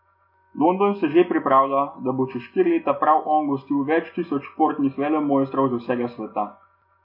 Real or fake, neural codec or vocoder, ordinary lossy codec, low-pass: real; none; MP3, 32 kbps; 5.4 kHz